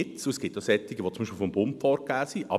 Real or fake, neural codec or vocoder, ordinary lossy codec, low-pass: real; none; none; 14.4 kHz